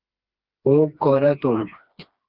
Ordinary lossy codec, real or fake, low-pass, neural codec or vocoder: Opus, 32 kbps; fake; 5.4 kHz; codec, 16 kHz, 2 kbps, FreqCodec, smaller model